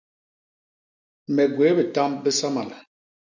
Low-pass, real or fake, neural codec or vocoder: 7.2 kHz; real; none